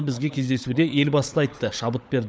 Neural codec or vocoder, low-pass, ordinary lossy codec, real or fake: codec, 16 kHz, 16 kbps, FunCodec, trained on LibriTTS, 50 frames a second; none; none; fake